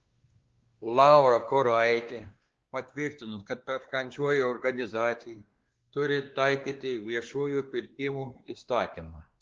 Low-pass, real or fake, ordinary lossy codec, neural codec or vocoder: 7.2 kHz; fake; Opus, 16 kbps; codec, 16 kHz, 2 kbps, X-Codec, WavLM features, trained on Multilingual LibriSpeech